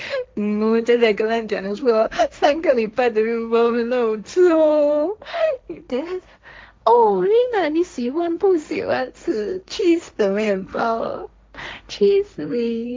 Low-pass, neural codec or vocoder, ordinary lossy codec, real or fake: none; codec, 16 kHz, 1.1 kbps, Voila-Tokenizer; none; fake